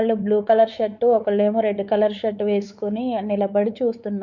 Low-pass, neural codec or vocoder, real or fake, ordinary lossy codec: 7.2 kHz; codec, 16 kHz, 4 kbps, X-Codec, WavLM features, trained on Multilingual LibriSpeech; fake; none